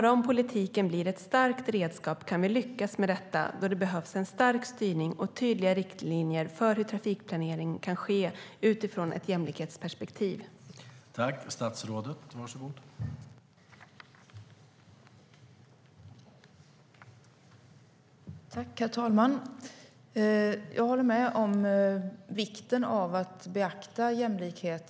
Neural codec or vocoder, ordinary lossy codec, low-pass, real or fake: none; none; none; real